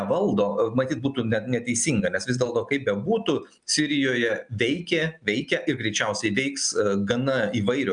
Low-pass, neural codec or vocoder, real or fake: 9.9 kHz; none; real